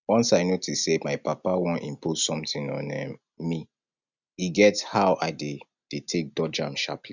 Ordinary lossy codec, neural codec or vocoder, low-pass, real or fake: none; none; 7.2 kHz; real